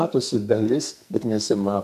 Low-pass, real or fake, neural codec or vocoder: 14.4 kHz; fake; codec, 44.1 kHz, 2.6 kbps, SNAC